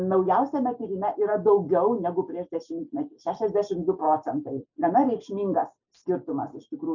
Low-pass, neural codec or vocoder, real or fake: 7.2 kHz; none; real